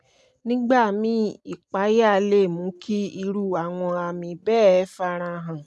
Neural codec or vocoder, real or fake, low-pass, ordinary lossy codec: none; real; none; none